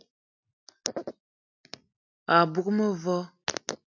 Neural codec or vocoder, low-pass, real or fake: none; 7.2 kHz; real